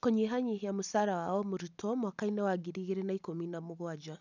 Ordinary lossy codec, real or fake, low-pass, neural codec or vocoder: none; real; 7.2 kHz; none